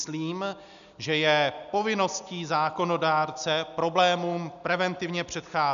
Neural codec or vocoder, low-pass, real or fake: none; 7.2 kHz; real